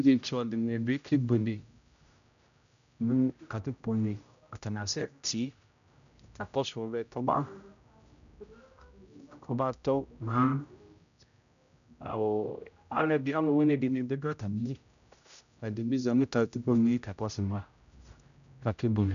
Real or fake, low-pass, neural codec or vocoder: fake; 7.2 kHz; codec, 16 kHz, 0.5 kbps, X-Codec, HuBERT features, trained on general audio